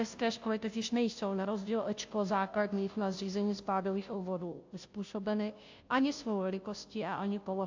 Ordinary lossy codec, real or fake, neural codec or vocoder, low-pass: AAC, 48 kbps; fake; codec, 16 kHz, 0.5 kbps, FunCodec, trained on Chinese and English, 25 frames a second; 7.2 kHz